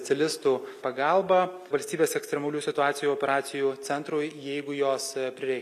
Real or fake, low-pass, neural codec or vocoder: real; 14.4 kHz; none